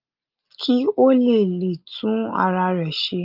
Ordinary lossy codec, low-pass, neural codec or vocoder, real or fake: Opus, 32 kbps; 5.4 kHz; none; real